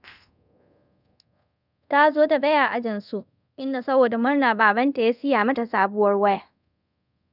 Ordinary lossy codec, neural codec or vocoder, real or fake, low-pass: none; codec, 24 kHz, 0.5 kbps, DualCodec; fake; 5.4 kHz